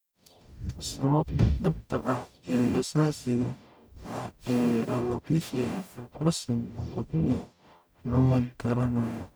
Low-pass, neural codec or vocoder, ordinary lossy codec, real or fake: none; codec, 44.1 kHz, 0.9 kbps, DAC; none; fake